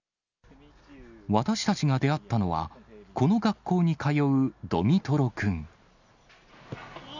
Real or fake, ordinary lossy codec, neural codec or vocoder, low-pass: real; none; none; 7.2 kHz